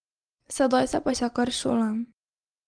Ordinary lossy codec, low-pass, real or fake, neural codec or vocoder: Opus, 24 kbps; 9.9 kHz; real; none